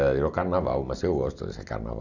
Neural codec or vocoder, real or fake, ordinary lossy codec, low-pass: none; real; none; 7.2 kHz